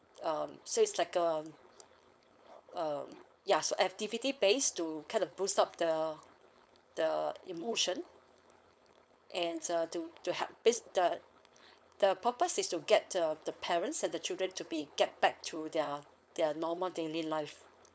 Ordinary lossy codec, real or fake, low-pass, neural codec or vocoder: none; fake; none; codec, 16 kHz, 4.8 kbps, FACodec